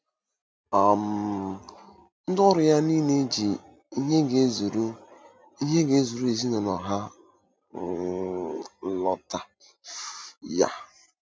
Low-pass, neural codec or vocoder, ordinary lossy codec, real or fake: none; none; none; real